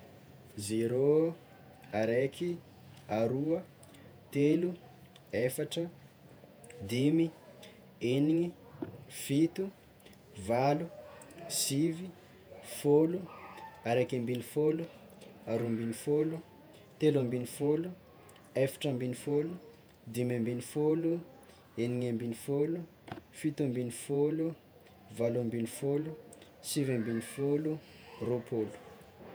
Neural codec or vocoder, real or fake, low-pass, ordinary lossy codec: vocoder, 48 kHz, 128 mel bands, Vocos; fake; none; none